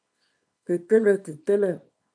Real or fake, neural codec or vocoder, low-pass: fake; codec, 24 kHz, 0.9 kbps, WavTokenizer, small release; 9.9 kHz